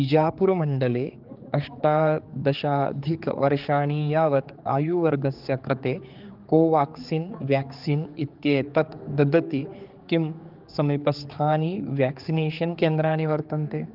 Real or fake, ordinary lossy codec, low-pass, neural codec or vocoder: fake; Opus, 32 kbps; 5.4 kHz; codec, 16 kHz, 4 kbps, X-Codec, HuBERT features, trained on general audio